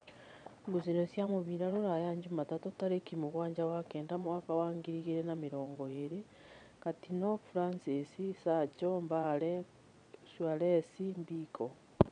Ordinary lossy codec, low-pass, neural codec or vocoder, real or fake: none; 9.9 kHz; vocoder, 22.05 kHz, 80 mel bands, Vocos; fake